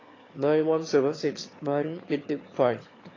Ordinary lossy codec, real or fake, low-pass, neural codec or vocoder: AAC, 32 kbps; fake; 7.2 kHz; autoencoder, 22.05 kHz, a latent of 192 numbers a frame, VITS, trained on one speaker